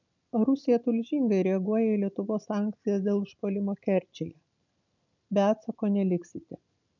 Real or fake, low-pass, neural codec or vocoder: real; 7.2 kHz; none